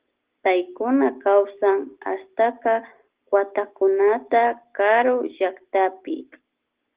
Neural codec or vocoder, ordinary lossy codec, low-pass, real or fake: none; Opus, 16 kbps; 3.6 kHz; real